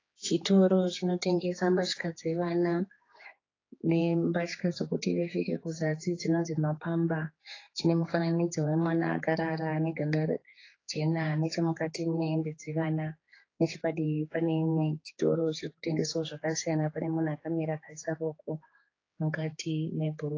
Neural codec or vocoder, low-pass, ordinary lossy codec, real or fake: codec, 16 kHz, 4 kbps, X-Codec, HuBERT features, trained on general audio; 7.2 kHz; AAC, 32 kbps; fake